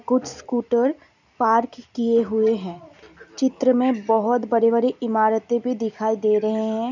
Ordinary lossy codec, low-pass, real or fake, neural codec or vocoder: none; 7.2 kHz; real; none